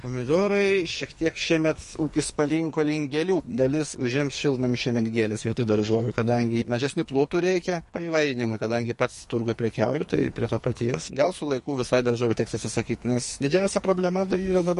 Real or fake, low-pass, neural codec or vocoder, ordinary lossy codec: fake; 14.4 kHz; codec, 44.1 kHz, 2.6 kbps, SNAC; MP3, 48 kbps